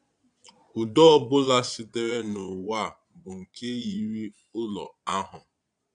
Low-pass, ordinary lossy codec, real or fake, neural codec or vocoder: 9.9 kHz; none; fake; vocoder, 22.05 kHz, 80 mel bands, Vocos